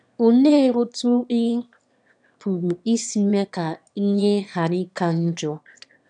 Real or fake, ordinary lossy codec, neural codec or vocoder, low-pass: fake; none; autoencoder, 22.05 kHz, a latent of 192 numbers a frame, VITS, trained on one speaker; 9.9 kHz